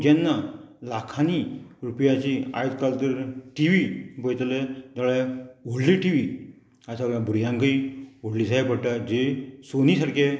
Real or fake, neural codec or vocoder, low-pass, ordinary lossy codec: real; none; none; none